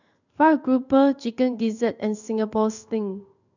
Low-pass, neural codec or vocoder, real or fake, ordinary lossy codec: 7.2 kHz; codec, 16 kHz in and 24 kHz out, 1 kbps, XY-Tokenizer; fake; none